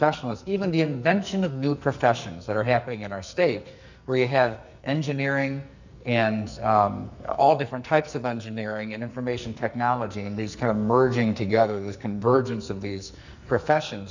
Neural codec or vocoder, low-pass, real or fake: codec, 44.1 kHz, 2.6 kbps, SNAC; 7.2 kHz; fake